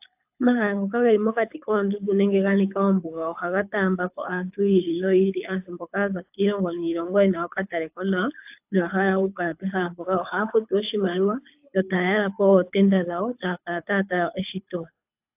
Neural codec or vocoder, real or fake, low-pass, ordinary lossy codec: codec, 24 kHz, 6 kbps, HILCodec; fake; 3.6 kHz; AAC, 32 kbps